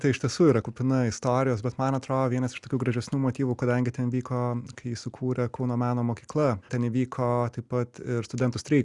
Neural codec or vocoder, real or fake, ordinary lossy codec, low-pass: none; real; Opus, 64 kbps; 10.8 kHz